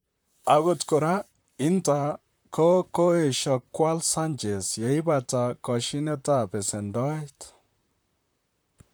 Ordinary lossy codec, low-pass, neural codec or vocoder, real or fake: none; none; vocoder, 44.1 kHz, 128 mel bands, Pupu-Vocoder; fake